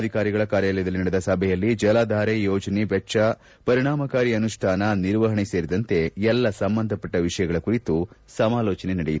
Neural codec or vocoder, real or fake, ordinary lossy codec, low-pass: none; real; none; none